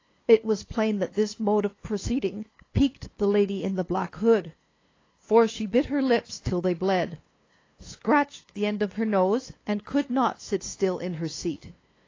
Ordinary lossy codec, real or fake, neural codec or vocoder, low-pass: AAC, 32 kbps; fake; codec, 16 kHz, 2 kbps, FunCodec, trained on LibriTTS, 25 frames a second; 7.2 kHz